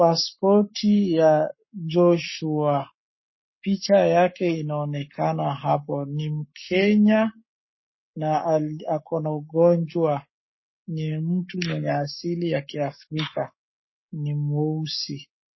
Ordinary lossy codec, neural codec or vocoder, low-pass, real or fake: MP3, 24 kbps; codec, 44.1 kHz, 7.8 kbps, Pupu-Codec; 7.2 kHz; fake